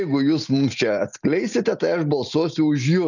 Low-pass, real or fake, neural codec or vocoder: 7.2 kHz; real; none